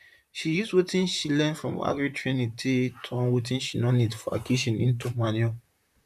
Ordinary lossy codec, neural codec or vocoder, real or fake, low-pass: none; vocoder, 44.1 kHz, 128 mel bands, Pupu-Vocoder; fake; 14.4 kHz